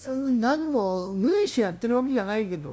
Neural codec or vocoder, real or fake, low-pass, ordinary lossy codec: codec, 16 kHz, 0.5 kbps, FunCodec, trained on LibriTTS, 25 frames a second; fake; none; none